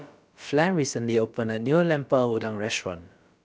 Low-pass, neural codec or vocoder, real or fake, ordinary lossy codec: none; codec, 16 kHz, about 1 kbps, DyCAST, with the encoder's durations; fake; none